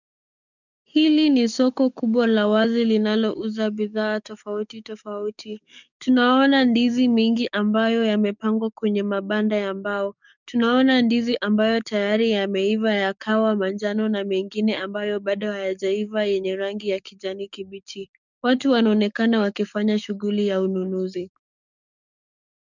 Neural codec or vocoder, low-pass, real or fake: codec, 44.1 kHz, 7.8 kbps, DAC; 7.2 kHz; fake